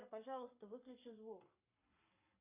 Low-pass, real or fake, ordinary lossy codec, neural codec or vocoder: 3.6 kHz; fake; AAC, 32 kbps; codec, 44.1 kHz, 7.8 kbps, Pupu-Codec